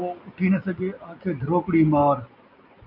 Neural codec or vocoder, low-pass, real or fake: none; 5.4 kHz; real